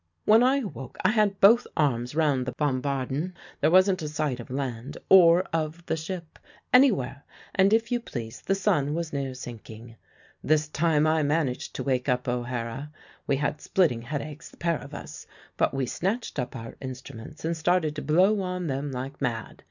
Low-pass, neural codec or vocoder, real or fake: 7.2 kHz; none; real